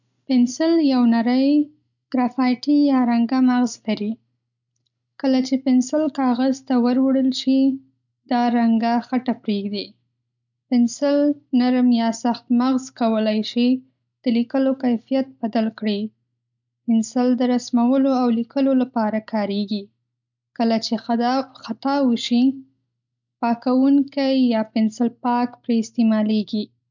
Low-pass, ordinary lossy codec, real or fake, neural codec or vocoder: 7.2 kHz; none; real; none